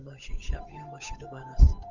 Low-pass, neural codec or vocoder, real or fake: 7.2 kHz; codec, 16 kHz, 8 kbps, FunCodec, trained on Chinese and English, 25 frames a second; fake